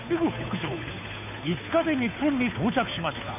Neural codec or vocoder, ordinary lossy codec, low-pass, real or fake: codec, 16 kHz, 2 kbps, FunCodec, trained on Chinese and English, 25 frames a second; none; 3.6 kHz; fake